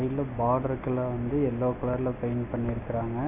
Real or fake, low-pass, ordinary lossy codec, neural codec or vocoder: real; 3.6 kHz; none; none